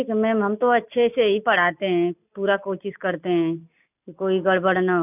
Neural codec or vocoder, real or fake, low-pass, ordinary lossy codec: none; real; 3.6 kHz; none